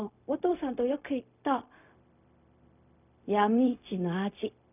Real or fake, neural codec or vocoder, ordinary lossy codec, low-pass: fake; codec, 16 kHz, 0.4 kbps, LongCat-Audio-Codec; none; 3.6 kHz